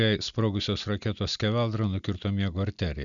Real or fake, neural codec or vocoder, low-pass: real; none; 7.2 kHz